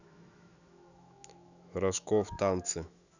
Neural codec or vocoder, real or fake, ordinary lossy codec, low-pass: autoencoder, 48 kHz, 128 numbers a frame, DAC-VAE, trained on Japanese speech; fake; none; 7.2 kHz